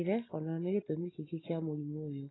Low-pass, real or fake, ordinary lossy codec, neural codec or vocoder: 7.2 kHz; real; AAC, 16 kbps; none